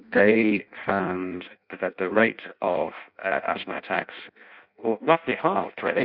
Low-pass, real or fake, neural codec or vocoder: 5.4 kHz; fake; codec, 16 kHz in and 24 kHz out, 0.6 kbps, FireRedTTS-2 codec